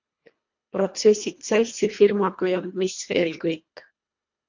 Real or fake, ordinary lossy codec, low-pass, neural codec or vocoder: fake; MP3, 48 kbps; 7.2 kHz; codec, 24 kHz, 1.5 kbps, HILCodec